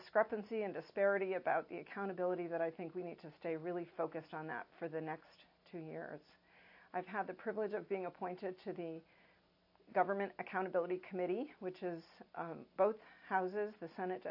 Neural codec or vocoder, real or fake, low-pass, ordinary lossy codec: none; real; 5.4 kHz; AAC, 48 kbps